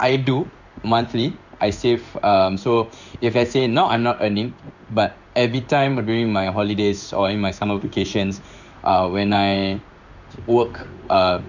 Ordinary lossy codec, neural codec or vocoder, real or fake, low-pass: none; codec, 16 kHz in and 24 kHz out, 1 kbps, XY-Tokenizer; fake; 7.2 kHz